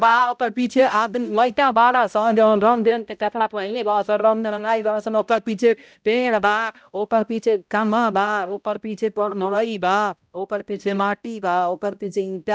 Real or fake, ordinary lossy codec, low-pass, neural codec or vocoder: fake; none; none; codec, 16 kHz, 0.5 kbps, X-Codec, HuBERT features, trained on balanced general audio